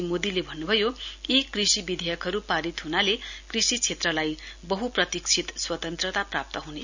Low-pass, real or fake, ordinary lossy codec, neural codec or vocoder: 7.2 kHz; real; none; none